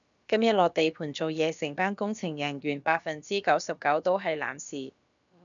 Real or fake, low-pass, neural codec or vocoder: fake; 7.2 kHz; codec, 16 kHz, about 1 kbps, DyCAST, with the encoder's durations